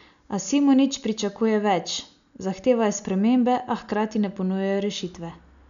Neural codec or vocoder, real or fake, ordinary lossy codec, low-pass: none; real; none; 7.2 kHz